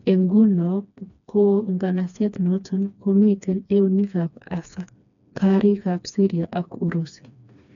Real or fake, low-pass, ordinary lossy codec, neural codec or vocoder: fake; 7.2 kHz; none; codec, 16 kHz, 2 kbps, FreqCodec, smaller model